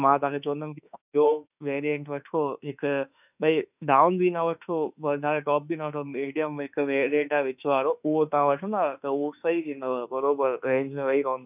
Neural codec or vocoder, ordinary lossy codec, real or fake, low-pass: autoencoder, 48 kHz, 32 numbers a frame, DAC-VAE, trained on Japanese speech; none; fake; 3.6 kHz